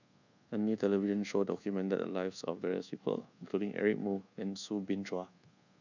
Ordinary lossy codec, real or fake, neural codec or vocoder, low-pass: none; fake; codec, 24 kHz, 1.2 kbps, DualCodec; 7.2 kHz